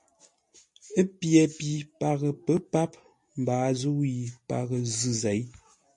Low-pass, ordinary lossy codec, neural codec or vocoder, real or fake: 10.8 kHz; MP3, 48 kbps; none; real